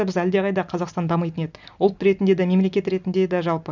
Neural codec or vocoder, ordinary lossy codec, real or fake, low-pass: none; none; real; 7.2 kHz